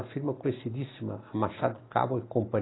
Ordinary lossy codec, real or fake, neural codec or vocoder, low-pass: AAC, 16 kbps; real; none; 7.2 kHz